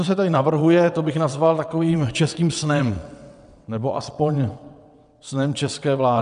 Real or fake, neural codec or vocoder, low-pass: fake; vocoder, 22.05 kHz, 80 mel bands, WaveNeXt; 9.9 kHz